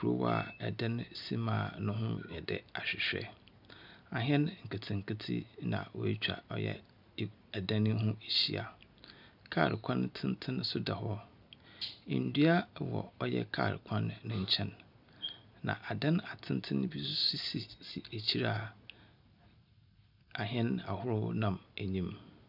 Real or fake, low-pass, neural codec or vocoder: real; 5.4 kHz; none